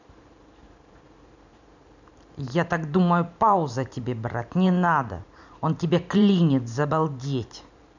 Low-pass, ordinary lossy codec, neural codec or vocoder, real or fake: 7.2 kHz; none; vocoder, 44.1 kHz, 128 mel bands every 512 samples, BigVGAN v2; fake